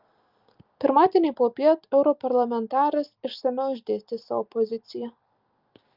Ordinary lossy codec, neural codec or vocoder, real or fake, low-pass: Opus, 24 kbps; none; real; 5.4 kHz